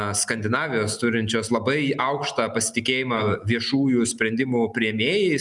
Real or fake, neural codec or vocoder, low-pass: real; none; 10.8 kHz